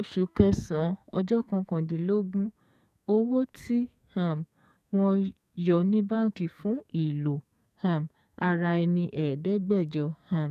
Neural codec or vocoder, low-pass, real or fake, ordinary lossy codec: codec, 44.1 kHz, 2.6 kbps, SNAC; 14.4 kHz; fake; none